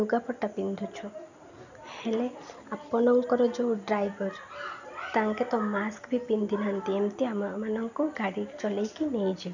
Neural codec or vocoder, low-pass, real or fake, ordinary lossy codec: none; 7.2 kHz; real; none